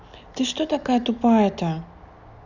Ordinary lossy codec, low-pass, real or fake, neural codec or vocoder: none; 7.2 kHz; real; none